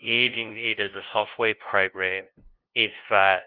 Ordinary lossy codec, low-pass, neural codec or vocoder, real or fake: Opus, 32 kbps; 5.4 kHz; codec, 16 kHz, 0.5 kbps, FunCodec, trained on LibriTTS, 25 frames a second; fake